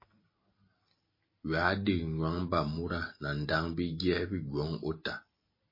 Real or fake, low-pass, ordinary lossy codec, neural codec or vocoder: real; 5.4 kHz; MP3, 24 kbps; none